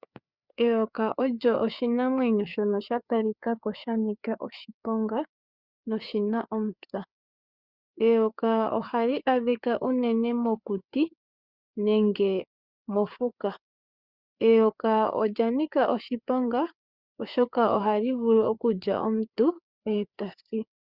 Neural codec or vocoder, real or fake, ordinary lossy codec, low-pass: codec, 16 kHz, 4 kbps, FreqCodec, larger model; fake; Opus, 64 kbps; 5.4 kHz